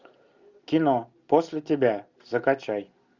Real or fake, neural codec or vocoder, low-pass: real; none; 7.2 kHz